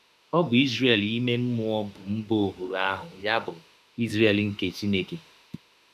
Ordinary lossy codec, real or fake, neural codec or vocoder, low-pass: none; fake; autoencoder, 48 kHz, 32 numbers a frame, DAC-VAE, trained on Japanese speech; 14.4 kHz